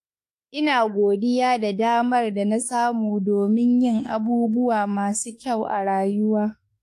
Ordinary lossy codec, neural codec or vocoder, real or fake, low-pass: AAC, 64 kbps; autoencoder, 48 kHz, 32 numbers a frame, DAC-VAE, trained on Japanese speech; fake; 14.4 kHz